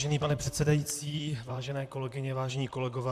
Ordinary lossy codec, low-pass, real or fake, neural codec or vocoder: AAC, 96 kbps; 14.4 kHz; fake; vocoder, 44.1 kHz, 128 mel bands, Pupu-Vocoder